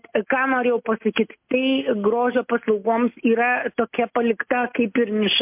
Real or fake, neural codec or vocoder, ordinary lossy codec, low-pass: real; none; MP3, 32 kbps; 3.6 kHz